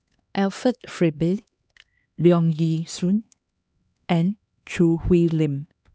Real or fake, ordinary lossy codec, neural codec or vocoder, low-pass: fake; none; codec, 16 kHz, 2 kbps, X-Codec, HuBERT features, trained on LibriSpeech; none